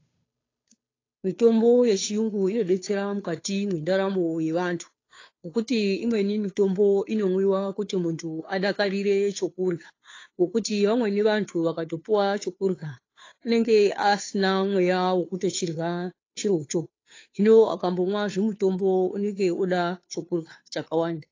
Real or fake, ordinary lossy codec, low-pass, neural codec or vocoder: fake; AAC, 32 kbps; 7.2 kHz; codec, 16 kHz, 2 kbps, FunCodec, trained on Chinese and English, 25 frames a second